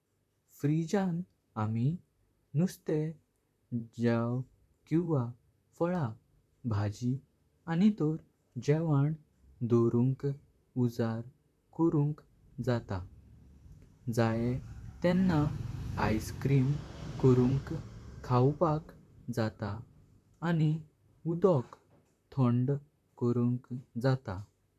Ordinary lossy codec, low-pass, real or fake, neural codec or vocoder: none; 14.4 kHz; fake; vocoder, 44.1 kHz, 128 mel bands, Pupu-Vocoder